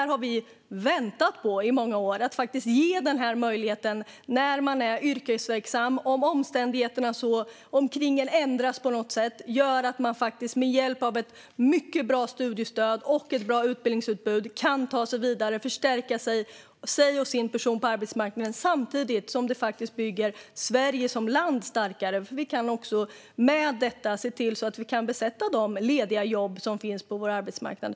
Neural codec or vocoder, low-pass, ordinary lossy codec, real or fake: none; none; none; real